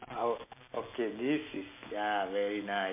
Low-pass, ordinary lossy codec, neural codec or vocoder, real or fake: 3.6 kHz; MP3, 32 kbps; none; real